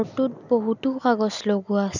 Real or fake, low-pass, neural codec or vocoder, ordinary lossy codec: real; 7.2 kHz; none; none